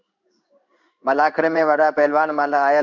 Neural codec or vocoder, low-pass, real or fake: codec, 16 kHz in and 24 kHz out, 1 kbps, XY-Tokenizer; 7.2 kHz; fake